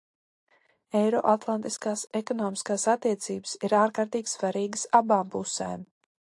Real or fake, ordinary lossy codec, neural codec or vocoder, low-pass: real; AAC, 64 kbps; none; 10.8 kHz